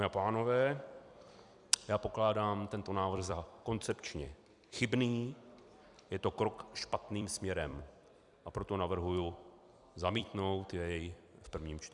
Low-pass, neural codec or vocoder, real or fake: 10.8 kHz; vocoder, 44.1 kHz, 128 mel bands every 256 samples, BigVGAN v2; fake